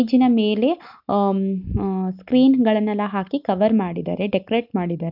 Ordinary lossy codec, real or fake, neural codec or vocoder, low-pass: none; real; none; 5.4 kHz